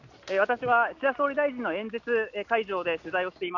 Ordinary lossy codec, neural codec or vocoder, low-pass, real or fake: none; none; 7.2 kHz; real